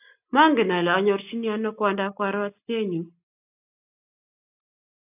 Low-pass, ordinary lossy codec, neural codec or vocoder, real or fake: 3.6 kHz; AAC, 32 kbps; none; real